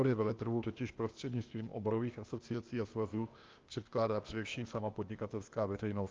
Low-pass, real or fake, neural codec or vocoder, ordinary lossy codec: 7.2 kHz; fake; codec, 16 kHz, 0.8 kbps, ZipCodec; Opus, 24 kbps